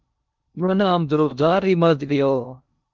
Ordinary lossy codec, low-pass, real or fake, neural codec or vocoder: Opus, 32 kbps; 7.2 kHz; fake; codec, 16 kHz in and 24 kHz out, 0.6 kbps, FocalCodec, streaming, 2048 codes